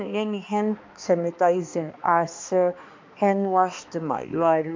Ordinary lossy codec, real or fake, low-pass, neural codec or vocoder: MP3, 64 kbps; fake; 7.2 kHz; codec, 16 kHz, 2 kbps, X-Codec, HuBERT features, trained on balanced general audio